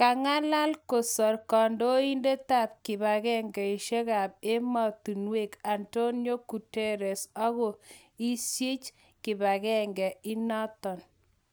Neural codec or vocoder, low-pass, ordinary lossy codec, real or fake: none; none; none; real